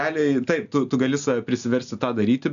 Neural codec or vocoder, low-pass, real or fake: none; 7.2 kHz; real